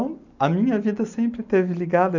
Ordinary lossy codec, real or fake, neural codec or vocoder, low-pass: none; real; none; 7.2 kHz